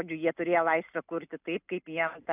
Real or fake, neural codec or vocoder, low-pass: real; none; 3.6 kHz